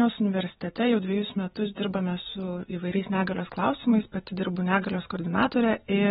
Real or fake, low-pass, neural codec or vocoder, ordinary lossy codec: real; 19.8 kHz; none; AAC, 16 kbps